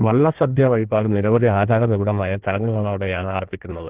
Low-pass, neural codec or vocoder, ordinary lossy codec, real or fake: 3.6 kHz; codec, 16 kHz in and 24 kHz out, 1.1 kbps, FireRedTTS-2 codec; Opus, 16 kbps; fake